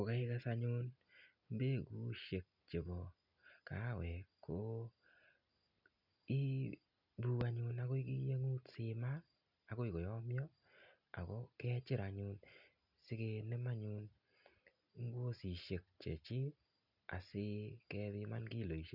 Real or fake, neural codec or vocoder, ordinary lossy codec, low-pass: real; none; none; 5.4 kHz